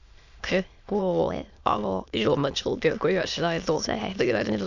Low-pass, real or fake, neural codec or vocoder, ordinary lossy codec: 7.2 kHz; fake; autoencoder, 22.05 kHz, a latent of 192 numbers a frame, VITS, trained on many speakers; none